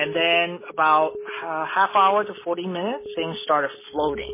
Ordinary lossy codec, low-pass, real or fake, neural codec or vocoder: MP3, 16 kbps; 3.6 kHz; real; none